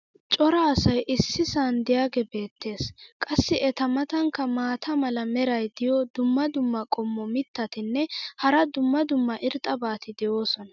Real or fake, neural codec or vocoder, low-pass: real; none; 7.2 kHz